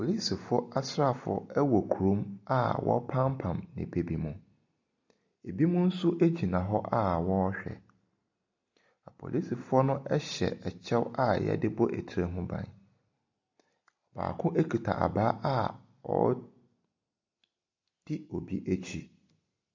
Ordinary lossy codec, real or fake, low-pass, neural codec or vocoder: MP3, 64 kbps; real; 7.2 kHz; none